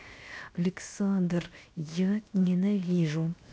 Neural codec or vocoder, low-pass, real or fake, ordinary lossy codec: codec, 16 kHz, 0.7 kbps, FocalCodec; none; fake; none